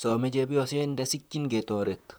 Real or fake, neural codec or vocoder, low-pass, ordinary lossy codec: fake; vocoder, 44.1 kHz, 128 mel bands, Pupu-Vocoder; none; none